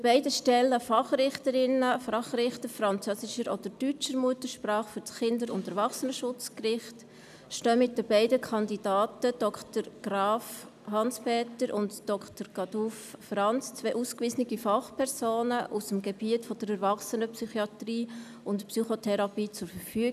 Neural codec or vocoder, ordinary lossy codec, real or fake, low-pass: none; none; real; 14.4 kHz